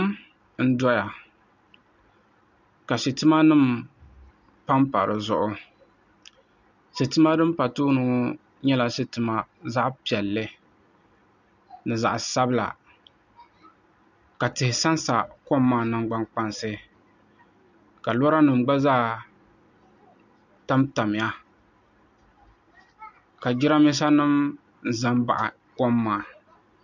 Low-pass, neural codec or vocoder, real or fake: 7.2 kHz; none; real